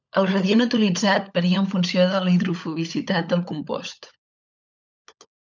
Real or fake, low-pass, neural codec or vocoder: fake; 7.2 kHz; codec, 16 kHz, 16 kbps, FunCodec, trained on LibriTTS, 50 frames a second